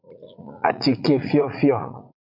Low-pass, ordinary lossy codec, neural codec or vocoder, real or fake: 5.4 kHz; MP3, 48 kbps; vocoder, 44.1 kHz, 80 mel bands, Vocos; fake